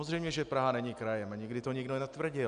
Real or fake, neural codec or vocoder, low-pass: real; none; 9.9 kHz